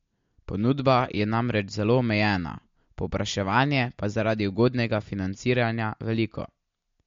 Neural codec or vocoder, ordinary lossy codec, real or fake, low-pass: none; MP3, 48 kbps; real; 7.2 kHz